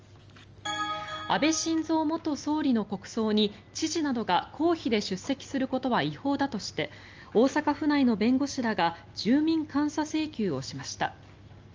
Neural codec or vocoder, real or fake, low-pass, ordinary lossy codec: none; real; 7.2 kHz; Opus, 24 kbps